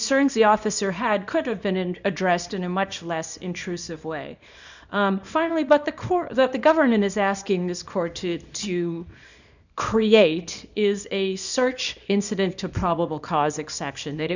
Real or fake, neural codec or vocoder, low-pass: fake; codec, 24 kHz, 0.9 kbps, WavTokenizer, small release; 7.2 kHz